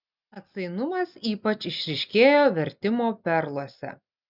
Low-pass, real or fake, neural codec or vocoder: 5.4 kHz; real; none